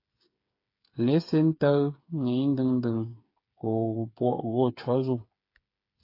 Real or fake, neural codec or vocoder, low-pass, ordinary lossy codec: fake; codec, 16 kHz, 8 kbps, FreqCodec, smaller model; 5.4 kHz; AAC, 32 kbps